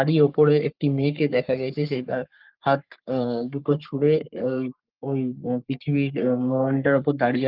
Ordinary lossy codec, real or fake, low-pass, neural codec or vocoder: Opus, 24 kbps; fake; 5.4 kHz; codec, 44.1 kHz, 3.4 kbps, Pupu-Codec